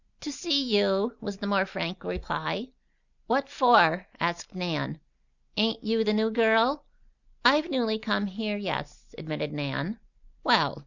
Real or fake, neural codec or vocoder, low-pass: real; none; 7.2 kHz